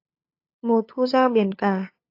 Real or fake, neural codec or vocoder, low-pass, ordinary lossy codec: fake; codec, 16 kHz, 8 kbps, FunCodec, trained on LibriTTS, 25 frames a second; 5.4 kHz; AAC, 32 kbps